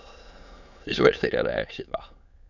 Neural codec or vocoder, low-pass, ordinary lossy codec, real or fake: autoencoder, 22.05 kHz, a latent of 192 numbers a frame, VITS, trained on many speakers; 7.2 kHz; none; fake